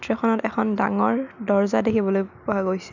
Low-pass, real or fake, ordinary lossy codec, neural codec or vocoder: 7.2 kHz; real; none; none